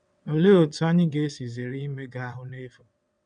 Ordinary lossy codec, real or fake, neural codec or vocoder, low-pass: none; fake; vocoder, 22.05 kHz, 80 mel bands, WaveNeXt; 9.9 kHz